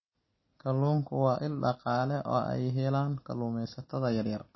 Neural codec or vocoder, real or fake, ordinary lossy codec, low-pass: none; real; MP3, 24 kbps; 7.2 kHz